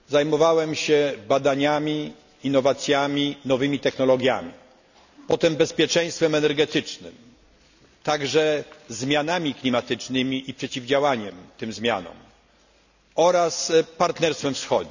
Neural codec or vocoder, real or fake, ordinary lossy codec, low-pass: none; real; none; 7.2 kHz